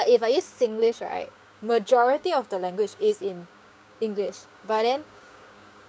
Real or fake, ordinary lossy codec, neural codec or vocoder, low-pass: fake; none; codec, 16 kHz, 6 kbps, DAC; none